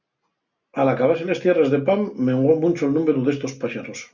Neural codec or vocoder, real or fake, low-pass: none; real; 7.2 kHz